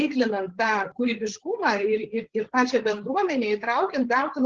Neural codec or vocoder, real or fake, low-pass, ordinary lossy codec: codec, 16 kHz, 8 kbps, FreqCodec, larger model; fake; 7.2 kHz; Opus, 16 kbps